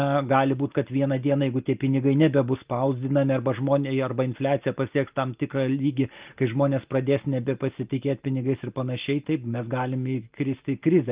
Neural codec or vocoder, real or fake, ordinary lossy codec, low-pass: none; real; Opus, 32 kbps; 3.6 kHz